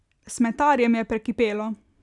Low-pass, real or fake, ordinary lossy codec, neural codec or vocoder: 10.8 kHz; real; none; none